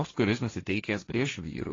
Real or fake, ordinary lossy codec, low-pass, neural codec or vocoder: fake; AAC, 32 kbps; 7.2 kHz; codec, 16 kHz, 1.1 kbps, Voila-Tokenizer